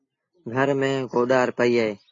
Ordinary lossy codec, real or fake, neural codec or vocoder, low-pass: AAC, 32 kbps; real; none; 7.2 kHz